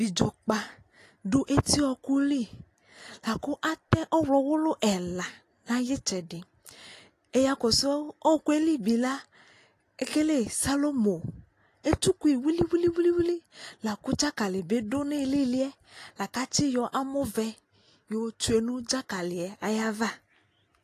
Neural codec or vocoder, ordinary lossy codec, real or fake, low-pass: none; AAC, 48 kbps; real; 14.4 kHz